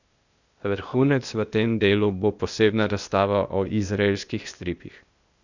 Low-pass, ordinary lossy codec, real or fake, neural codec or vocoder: 7.2 kHz; none; fake; codec, 16 kHz, 0.8 kbps, ZipCodec